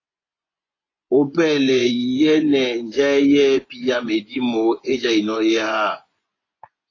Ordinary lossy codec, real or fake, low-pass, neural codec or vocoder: AAC, 32 kbps; fake; 7.2 kHz; vocoder, 24 kHz, 100 mel bands, Vocos